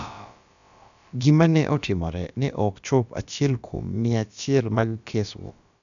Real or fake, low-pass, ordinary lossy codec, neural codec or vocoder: fake; 7.2 kHz; none; codec, 16 kHz, about 1 kbps, DyCAST, with the encoder's durations